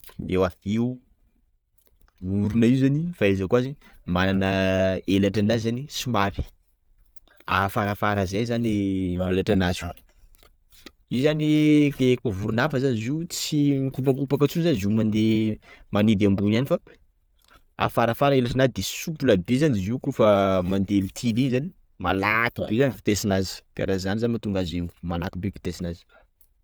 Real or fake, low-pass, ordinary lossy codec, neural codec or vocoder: real; none; none; none